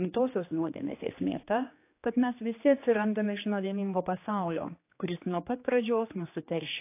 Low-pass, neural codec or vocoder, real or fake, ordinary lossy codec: 3.6 kHz; codec, 16 kHz, 2 kbps, X-Codec, HuBERT features, trained on general audio; fake; AAC, 24 kbps